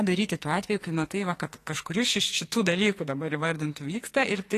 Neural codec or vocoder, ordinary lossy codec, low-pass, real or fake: codec, 44.1 kHz, 2.6 kbps, SNAC; AAC, 48 kbps; 14.4 kHz; fake